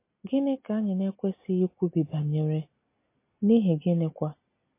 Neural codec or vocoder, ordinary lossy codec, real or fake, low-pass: none; MP3, 24 kbps; real; 3.6 kHz